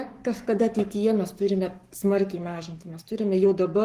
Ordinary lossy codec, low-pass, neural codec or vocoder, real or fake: Opus, 24 kbps; 14.4 kHz; codec, 44.1 kHz, 7.8 kbps, Pupu-Codec; fake